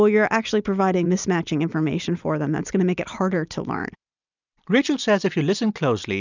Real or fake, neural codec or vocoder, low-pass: real; none; 7.2 kHz